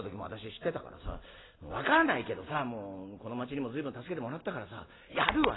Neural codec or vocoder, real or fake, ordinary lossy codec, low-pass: none; real; AAC, 16 kbps; 7.2 kHz